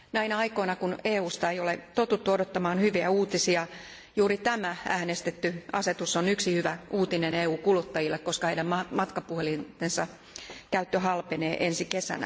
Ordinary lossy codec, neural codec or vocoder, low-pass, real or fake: none; none; none; real